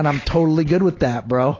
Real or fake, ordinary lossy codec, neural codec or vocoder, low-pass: real; AAC, 32 kbps; none; 7.2 kHz